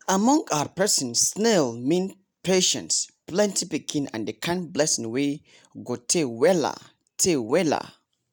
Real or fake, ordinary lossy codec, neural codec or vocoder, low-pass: real; none; none; none